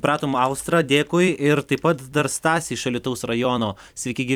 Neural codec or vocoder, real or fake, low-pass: vocoder, 44.1 kHz, 128 mel bands every 256 samples, BigVGAN v2; fake; 19.8 kHz